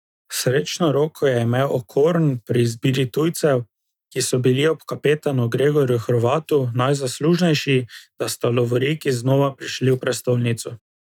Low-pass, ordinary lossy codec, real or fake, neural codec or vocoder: 19.8 kHz; none; real; none